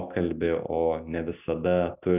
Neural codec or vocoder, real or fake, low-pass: none; real; 3.6 kHz